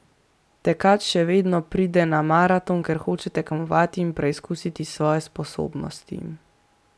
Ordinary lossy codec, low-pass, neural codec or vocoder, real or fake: none; none; none; real